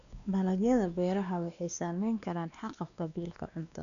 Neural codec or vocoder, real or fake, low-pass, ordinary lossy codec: codec, 16 kHz, 2 kbps, X-Codec, WavLM features, trained on Multilingual LibriSpeech; fake; 7.2 kHz; none